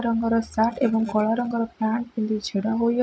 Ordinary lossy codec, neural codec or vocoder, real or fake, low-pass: none; none; real; none